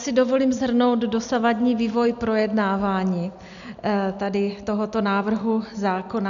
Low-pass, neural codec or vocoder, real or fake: 7.2 kHz; none; real